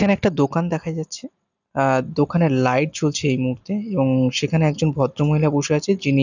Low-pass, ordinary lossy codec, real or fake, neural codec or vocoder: 7.2 kHz; none; real; none